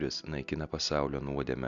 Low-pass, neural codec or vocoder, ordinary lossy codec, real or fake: 7.2 kHz; none; Opus, 64 kbps; real